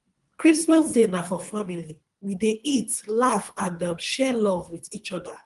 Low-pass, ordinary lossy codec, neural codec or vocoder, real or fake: 10.8 kHz; Opus, 32 kbps; codec, 24 kHz, 3 kbps, HILCodec; fake